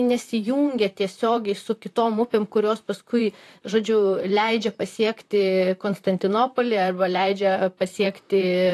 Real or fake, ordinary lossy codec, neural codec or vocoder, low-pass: fake; AAC, 64 kbps; vocoder, 44.1 kHz, 128 mel bands, Pupu-Vocoder; 14.4 kHz